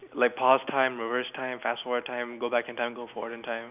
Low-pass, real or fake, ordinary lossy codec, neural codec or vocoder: 3.6 kHz; real; none; none